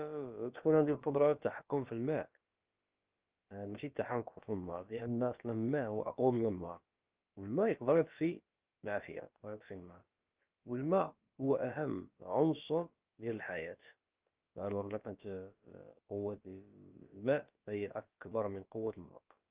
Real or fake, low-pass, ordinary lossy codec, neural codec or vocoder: fake; 3.6 kHz; Opus, 24 kbps; codec, 16 kHz, about 1 kbps, DyCAST, with the encoder's durations